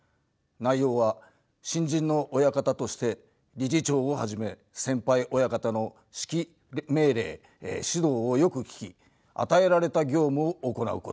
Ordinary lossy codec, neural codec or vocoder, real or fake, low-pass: none; none; real; none